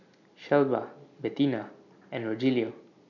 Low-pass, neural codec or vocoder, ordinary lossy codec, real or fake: 7.2 kHz; none; none; real